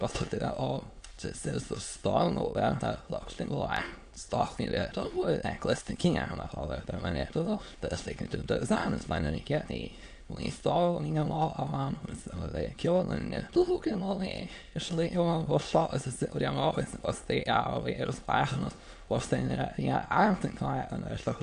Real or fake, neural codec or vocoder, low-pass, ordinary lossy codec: fake; autoencoder, 22.05 kHz, a latent of 192 numbers a frame, VITS, trained on many speakers; 9.9 kHz; AAC, 64 kbps